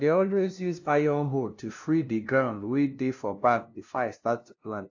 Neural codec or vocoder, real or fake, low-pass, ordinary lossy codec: codec, 16 kHz, 0.5 kbps, FunCodec, trained on LibriTTS, 25 frames a second; fake; 7.2 kHz; AAC, 48 kbps